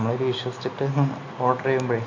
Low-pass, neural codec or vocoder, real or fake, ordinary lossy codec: 7.2 kHz; none; real; AAC, 48 kbps